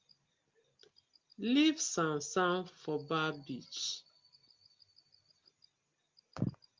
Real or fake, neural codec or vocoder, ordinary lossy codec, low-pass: real; none; Opus, 32 kbps; 7.2 kHz